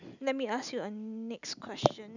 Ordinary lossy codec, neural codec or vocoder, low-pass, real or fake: none; none; 7.2 kHz; real